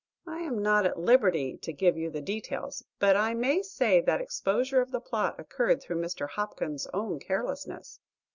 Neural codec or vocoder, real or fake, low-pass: none; real; 7.2 kHz